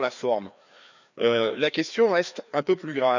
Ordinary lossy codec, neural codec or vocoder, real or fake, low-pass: none; codec, 16 kHz, 2 kbps, FreqCodec, larger model; fake; 7.2 kHz